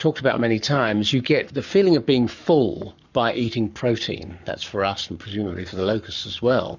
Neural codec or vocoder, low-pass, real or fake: codec, 44.1 kHz, 7.8 kbps, Pupu-Codec; 7.2 kHz; fake